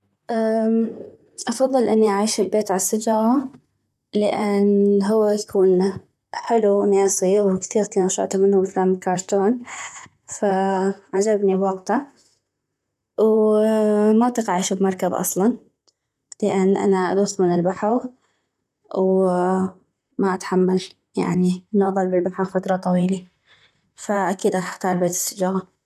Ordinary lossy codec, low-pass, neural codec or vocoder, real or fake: none; 14.4 kHz; vocoder, 44.1 kHz, 128 mel bands, Pupu-Vocoder; fake